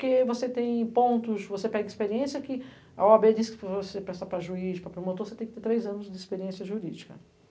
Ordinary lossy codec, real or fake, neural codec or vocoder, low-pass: none; real; none; none